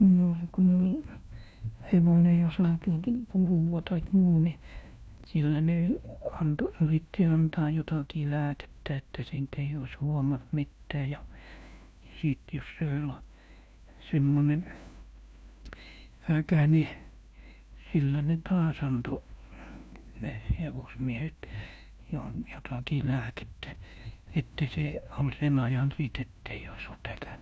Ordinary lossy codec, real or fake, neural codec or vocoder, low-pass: none; fake; codec, 16 kHz, 1 kbps, FunCodec, trained on LibriTTS, 50 frames a second; none